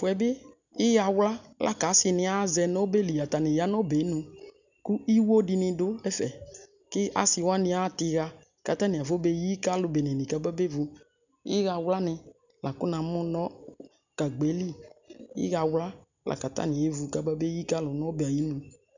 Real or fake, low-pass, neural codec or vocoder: real; 7.2 kHz; none